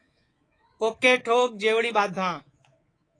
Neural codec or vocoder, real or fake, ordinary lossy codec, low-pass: codec, 24 kHz, 3.1 kbps, DualCodec; fake; AAC, 32 kbps; 9.9 kHz